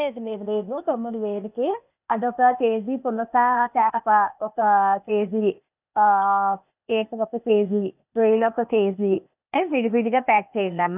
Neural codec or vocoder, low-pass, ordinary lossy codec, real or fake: codec, 16 kHz, 0.8 kbps, ZipCodec; 3.6 kHz; none; fake